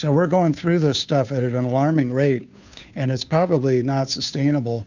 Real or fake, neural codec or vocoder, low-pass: fake; codec, 16 kHz, 2 kbps, FunCodec, trained on Chinese and English, 25 frames a second; 7.2 kHz